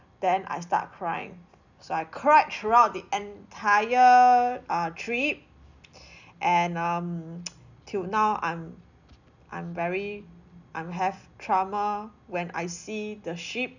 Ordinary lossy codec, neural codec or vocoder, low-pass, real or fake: none; none; 7.2 kHz; real